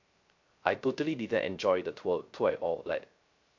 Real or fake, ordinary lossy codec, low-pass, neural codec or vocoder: fake; MP3, 48 kbps; 7.2 kHz; codec, 16 kHz, 0.3 kbps, FocalCodec